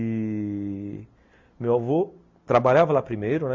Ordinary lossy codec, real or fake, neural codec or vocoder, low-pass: MP3, 64 kbps; real; none; 7.2 kHz